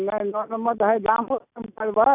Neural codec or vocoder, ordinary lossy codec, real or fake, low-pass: none; none; real; 3.6 kHz